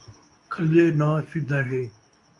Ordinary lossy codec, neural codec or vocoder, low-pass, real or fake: AAC, 64 kbps; codec, 24 kHz, 0.9 kbps, WavTokenizer, medium speech release version 2; 10.8 kHz; fake